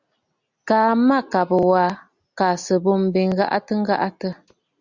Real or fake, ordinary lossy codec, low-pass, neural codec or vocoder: real; Opus, 64 kbps; 7.2 kHz; none